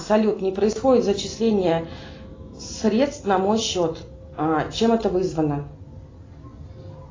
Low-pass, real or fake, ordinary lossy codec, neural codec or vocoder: 7.2 kHz; real; AAC, 32 kbps; none